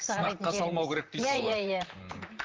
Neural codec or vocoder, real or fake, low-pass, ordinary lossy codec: vocoder, 44.1 kHz, 128 mel bands, Pupu-Vocoder; fake; 7.2 kHz; Opus, 32 kbps